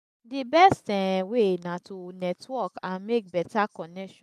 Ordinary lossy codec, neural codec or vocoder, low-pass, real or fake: none; none; 14.4 kHz; real